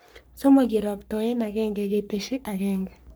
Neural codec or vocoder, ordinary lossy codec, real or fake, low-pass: codec, 44.1 kHz, 3.4 kbps, Pupu-Codec; none; fake; none